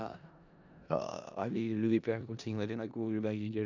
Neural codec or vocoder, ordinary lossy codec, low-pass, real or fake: codec, 16 kHz in and 24 kHz out, 0.4 kbps, LongCat-Audio-Codec, four codebook decoder; none; 7.2 kHz; fake